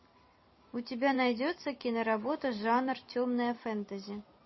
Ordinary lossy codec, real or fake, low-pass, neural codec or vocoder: MP3, 24 kbps; fake; 7.2 kHz; vocoder, 44.1 kHz, 128 mel bands every 256 samples, BigVGAN v2